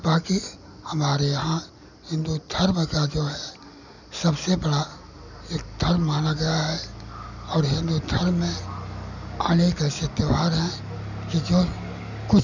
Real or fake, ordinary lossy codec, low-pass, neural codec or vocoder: real; none; 7.2 kHz; none